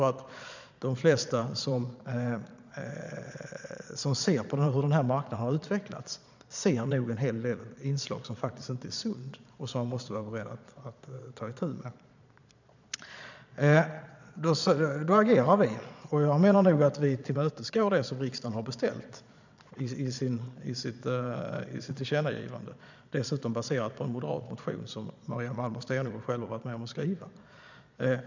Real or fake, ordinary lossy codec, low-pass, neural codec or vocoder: fake; none; 7.2 kHz; vocoder, 22.05 kHz, 80 mel bands, Vocos